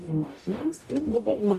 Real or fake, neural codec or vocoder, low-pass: fake; codec, 44.1 kHz, 0.9 kbps, DAC; 14.4 kHz